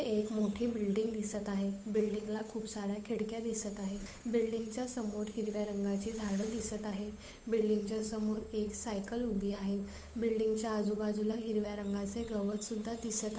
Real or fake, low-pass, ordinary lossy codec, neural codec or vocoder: fake; none; none; codec, 16 kHz, 8 kbps, FunCodec, trained on Chinese and English, 25 frames a second